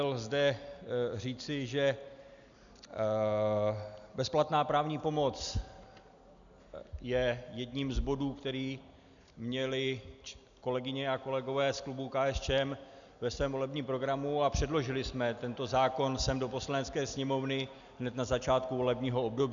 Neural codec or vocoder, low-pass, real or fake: none; 7.2 kHz; real